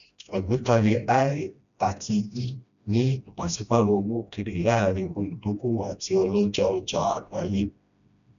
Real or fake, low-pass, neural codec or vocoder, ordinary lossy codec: fake; 7.2 kHz; codec, 16 kHz, 1 kbps, FreqCodec, smaller model; none